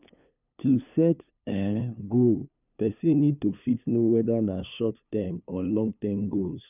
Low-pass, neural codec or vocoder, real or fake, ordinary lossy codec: 3.6 kHz; codec, 16 kHz, 2 kbps, FunCodec, trained on LibriTTS, 25 frames a second; fake; none